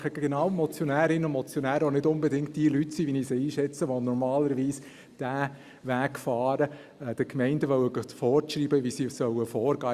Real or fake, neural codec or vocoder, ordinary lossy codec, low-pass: real; none; Opus, 64 kbps; 14.4 kHz